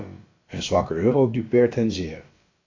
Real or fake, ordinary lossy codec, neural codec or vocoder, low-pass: fake; AAC, 32 kbps; codec, 16 kHz, about 1 kbps, DyCAST, with the encoder's durations; 7.2 kHz